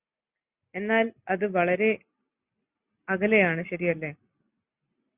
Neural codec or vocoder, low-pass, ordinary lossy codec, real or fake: none; 3.6 kHz; Opus, 64 kbps; real